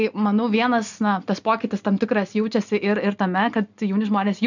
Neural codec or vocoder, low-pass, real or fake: none; 7.2 kHz; real